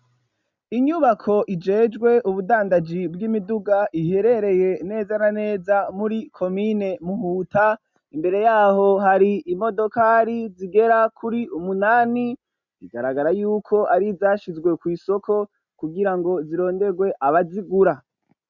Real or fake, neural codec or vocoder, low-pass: real; none; 7.2 kHz